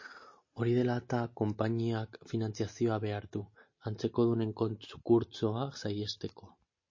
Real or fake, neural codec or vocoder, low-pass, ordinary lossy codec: real; none; 7.2 kHz; MP3, 32 kbps